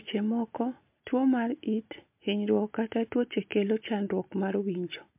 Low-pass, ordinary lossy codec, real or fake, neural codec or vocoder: 3.6 kHz; MP3, 32 kbps; real; none